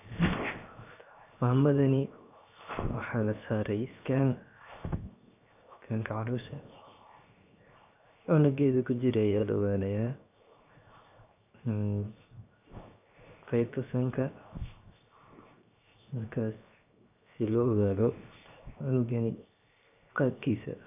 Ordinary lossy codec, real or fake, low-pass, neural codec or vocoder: none; fake; 3.6 kHz; codec, 16 kHz, 0.7 kbps, FocalCodec